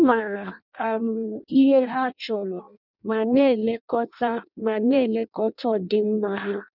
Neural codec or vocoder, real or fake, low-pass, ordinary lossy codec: codec, 16 kHz in and 24 kHz out, 0.6 kbps, FireRedTTS-2 codec; fake; 5.4 kHz; none